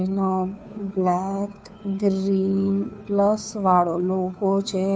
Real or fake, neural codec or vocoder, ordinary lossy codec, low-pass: fake; codec, 16 kHz, 2 kbps, FunCodec, trained on Chinese and English, 25 frames a second; none; none